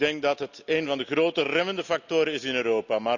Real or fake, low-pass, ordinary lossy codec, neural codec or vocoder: real; 7.2 kHz; none; none